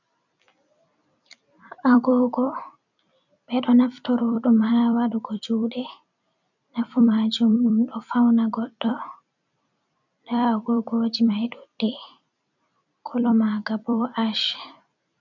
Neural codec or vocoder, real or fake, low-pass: vocoder, 44.1 kHz, 128 mel bands every 256 samples, BigVGAN v2; fake; 7.2 kHz